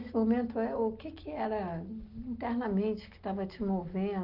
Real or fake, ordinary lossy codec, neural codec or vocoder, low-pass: real; Opus, 24 kbps; none; 5.4 kHz